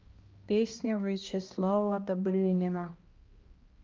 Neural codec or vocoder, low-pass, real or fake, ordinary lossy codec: codec, 16 kHz, 1 kbps, X-Codec, HuBERT features, trained on balanced general audio; 7.2 kHz; fake; Opus, 24 kbps